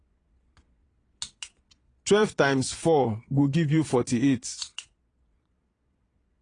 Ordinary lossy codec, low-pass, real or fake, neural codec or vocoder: AAC, 32 kbps; 9.9 kHz; fake; vocoder, 22.05 kHz, 80 mel bands, Vocos